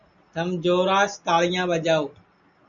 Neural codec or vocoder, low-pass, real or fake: none; 7.2 kHz; real